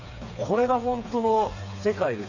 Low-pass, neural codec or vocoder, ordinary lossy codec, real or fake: 7.2 kHz; codec, 16 kHz, 4 kbps, FreqCodec, smaller model; none; fake